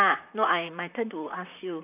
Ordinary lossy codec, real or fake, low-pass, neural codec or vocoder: none; fake; 3.6 kHz; vocoder, 44.1 kHz, 128 mel bands, Pupu-Vocoder